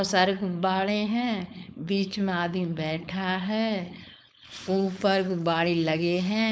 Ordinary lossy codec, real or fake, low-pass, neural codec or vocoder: none; fake; none; codec, 16 kHz, 4.8 kbps, FACodec